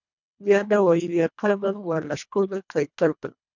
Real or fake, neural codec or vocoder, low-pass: fake; codec, 24 kHz, 1.5 kbps, HILCodec; 7.2 kHz